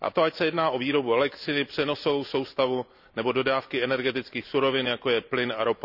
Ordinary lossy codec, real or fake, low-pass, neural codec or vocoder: none; real; 5.4 kHz; none